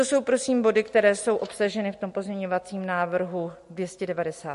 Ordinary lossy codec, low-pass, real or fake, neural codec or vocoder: MP3, 48 kbps; 14.4 kHz; real; none